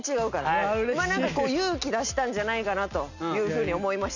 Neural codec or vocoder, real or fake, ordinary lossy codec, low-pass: none; real; none; 7.2 kHz